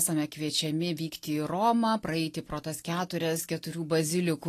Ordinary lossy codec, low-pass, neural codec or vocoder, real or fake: AAC, 48 kbps; 14.4 kHz; none; real